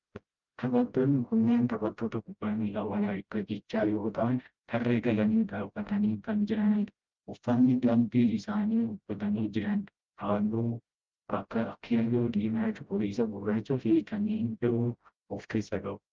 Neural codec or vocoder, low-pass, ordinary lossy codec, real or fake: codec, 16 kHz, 0.5 kbps, FreqCodec, smaller model; 7.2 kHz; Opus, 24 kbps; fake